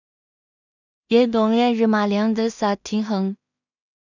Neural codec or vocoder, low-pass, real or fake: codec, 16 kHz in and 24 kHz out, 0.4 kbps, LongCat-Audio-Codec, two codebook decoder; 7.2 kHz; fake